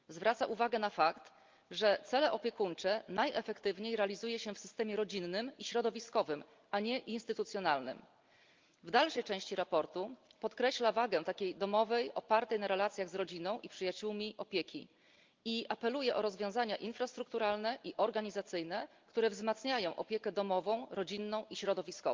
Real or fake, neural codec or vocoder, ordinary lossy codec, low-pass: real; none; Opus, 32 kbps; 7.2 kHz